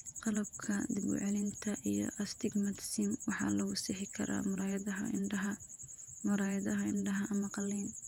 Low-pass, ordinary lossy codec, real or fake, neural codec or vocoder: 19.8 kHz; none; fake; vocoder, 48 kHz, 128 mel bands, Vocos